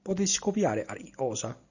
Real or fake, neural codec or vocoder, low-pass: real; none; 7.2 kHz